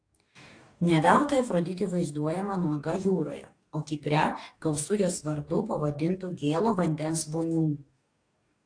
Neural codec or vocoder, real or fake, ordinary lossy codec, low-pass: codec, 44.1 kHz, 2.6 kbps, DAC; fake; AAC, 48 kbps; 9.9 kHz